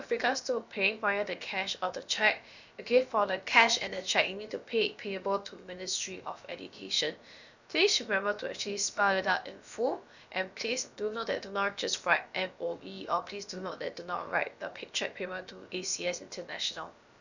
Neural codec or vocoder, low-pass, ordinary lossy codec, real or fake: codec, 16 kHz, about 1 kbps, DyCAST, with the encoder's durations; 7.2 kHz; none; fake